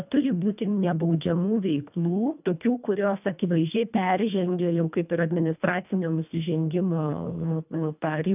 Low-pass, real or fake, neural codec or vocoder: 3.6 kHz; fake; codec, 24 kHz, 1.5 kbps, HILCodec